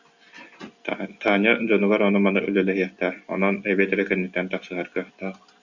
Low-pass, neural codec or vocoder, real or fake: 7.2 kHz; none; real